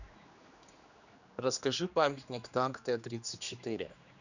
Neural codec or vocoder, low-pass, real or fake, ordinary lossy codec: codec, 16 kHz, 2 kbps, X-Codec, HuBERT features, trained on general audio; 7.2 kHz; fake; none